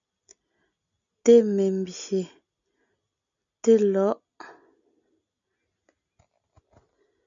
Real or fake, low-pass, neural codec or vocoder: real; 7.2 kHz; none